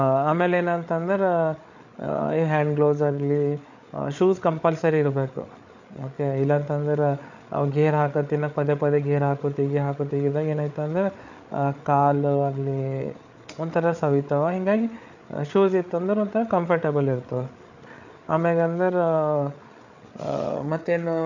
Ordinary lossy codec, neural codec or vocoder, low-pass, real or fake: none; codec, 16 kHz, 8 kbps, FreqCodec, larger model; 7.2 kHz; fake